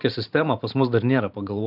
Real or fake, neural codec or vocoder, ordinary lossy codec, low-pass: fake; vocoder, 44.1 kHz, 128 mel bands every 512 samples, BigVGAN v2; AAC, 48 kbps; 5.4 kHz